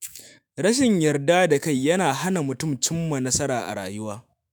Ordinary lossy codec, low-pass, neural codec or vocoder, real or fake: none; none; autoencoder, 48 kHz, 128 numbers a frame, DAC-VAE, trained on Japanese speech; fake